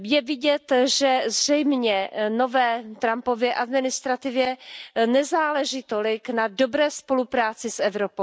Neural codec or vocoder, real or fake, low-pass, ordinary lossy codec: none; real; none; none